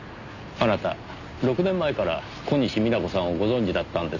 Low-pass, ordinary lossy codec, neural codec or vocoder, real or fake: 7.2 kHz; none; none; real